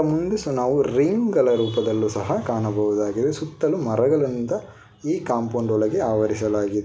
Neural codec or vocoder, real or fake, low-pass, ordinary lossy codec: none; real; none; none